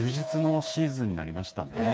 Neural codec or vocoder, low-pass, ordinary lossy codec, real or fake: codec, 16 kHz, 4 kbps, FreqCodec, smaller model; none; none; fake